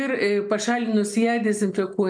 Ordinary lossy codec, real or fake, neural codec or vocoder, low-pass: MP3, 96 kbps; real; none; 9.9 kHz